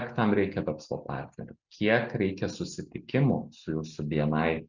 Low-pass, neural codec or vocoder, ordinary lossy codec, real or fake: 7.2 kHz; none; Opus, 64 kbps; real